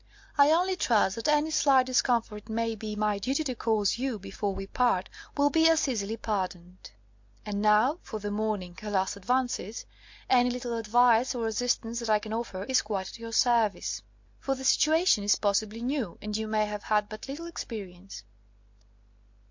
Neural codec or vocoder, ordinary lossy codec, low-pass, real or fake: none; MP3, 48 kbps; 7.2 kHz; real